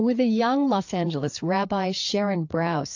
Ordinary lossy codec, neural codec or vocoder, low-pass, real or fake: AAC, 48 kbps; codec, 16 kHz, 4 kbps, FreqCodec, larger model; 7.2 kHz; fake